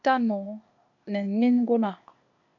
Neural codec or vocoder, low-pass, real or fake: codec, 16 kHz, 0.8 kbps, ZipCodec; 7.2 kHz; fake